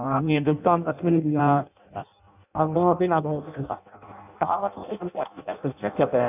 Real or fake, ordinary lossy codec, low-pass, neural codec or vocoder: fake; none; 3.6 kHz; codec, 16 kHz in and 24 kHz out, 0.6 kbps, FireRedTTS-2 codec